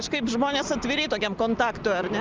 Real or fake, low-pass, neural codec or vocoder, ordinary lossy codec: real; 7.2 kHz; none; Opus, 24 kbps